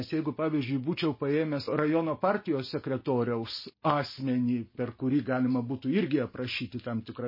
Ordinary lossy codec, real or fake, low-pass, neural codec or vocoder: MP3, 24 kbps; real; 5.4 kHz; none